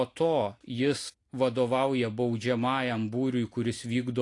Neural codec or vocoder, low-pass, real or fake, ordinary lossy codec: none; 10.8 kHz; real; AAC, 48 kbps